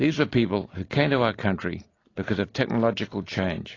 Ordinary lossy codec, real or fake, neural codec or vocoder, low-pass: AAC, 32 kbps; real; none; 7.2 kHz